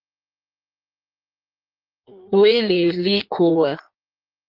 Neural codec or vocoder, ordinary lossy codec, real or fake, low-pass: codec, 16 kHz in and 24 kHz out, 1.1 kbps, FireRedTTS-2 codec; Opus, 24 kbps; fake; 5.4 kHz